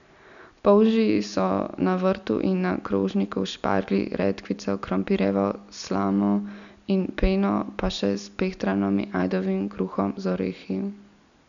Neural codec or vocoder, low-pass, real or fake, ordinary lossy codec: none; 7.2 kHz; real; none